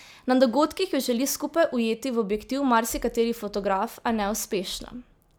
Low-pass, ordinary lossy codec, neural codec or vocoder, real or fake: none; none; none; real